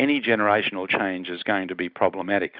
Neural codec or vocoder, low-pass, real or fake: none; 5.4 kHz; real